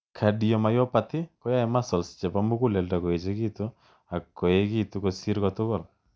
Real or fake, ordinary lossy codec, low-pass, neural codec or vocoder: real; none; none; none